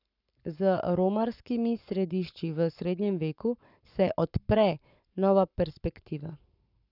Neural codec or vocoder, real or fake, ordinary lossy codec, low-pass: codec, 44.1 kHz, 7.8 kbps, Pupu-Codec; fake; none; 5.4 kHz